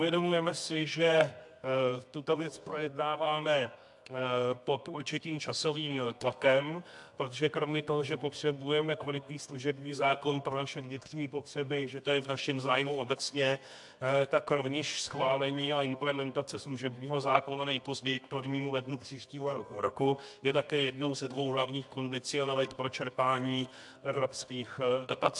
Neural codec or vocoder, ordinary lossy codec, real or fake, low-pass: codec, 24 kHz, 0.9 kbps, WavTokenizer, medium music audio release; AAC, 64 kbps; fake; 10.8 kHz